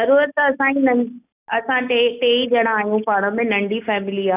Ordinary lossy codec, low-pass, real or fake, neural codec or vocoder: none; 3.6 kHz; real; none